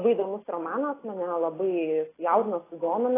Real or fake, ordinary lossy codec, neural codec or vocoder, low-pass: real; AAC, 16 kbps; none; 3.6 kHz